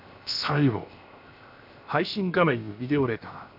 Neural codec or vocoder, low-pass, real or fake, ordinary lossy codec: codec, 16 kHz, 0.7 kbps, FocalCodec; 5.4 kHz; fake; none